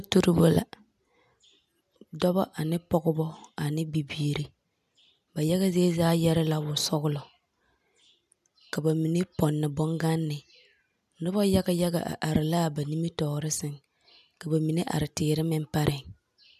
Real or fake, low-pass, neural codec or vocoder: real; 14.4 kHz; none